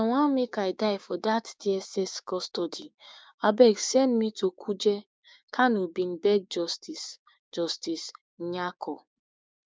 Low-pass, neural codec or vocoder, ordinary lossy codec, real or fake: none; codec, 16 kHz, 6 kbps, DAC; none; fake